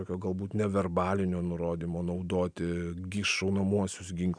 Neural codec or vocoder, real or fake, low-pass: none; real; 9.9 kHz